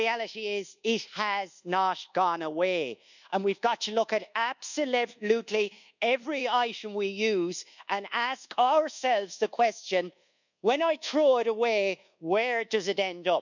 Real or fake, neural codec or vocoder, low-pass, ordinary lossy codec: fake; codec, 24 kHz, 1.2 kbps, DualCodec; 7.2 kHz; none